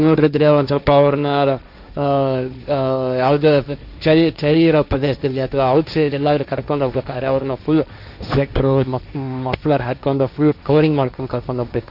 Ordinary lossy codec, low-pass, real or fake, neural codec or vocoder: none; 5.4 kHz; fake; codec, 16 kHz, 1.1 kbps, Voila-Tokenizer